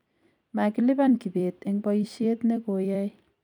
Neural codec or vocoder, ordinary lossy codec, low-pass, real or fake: vocoder, 44.1 kHz, 128 mel bands every 256 samples, BigVGAN v2; none; 19.8 kHz; fake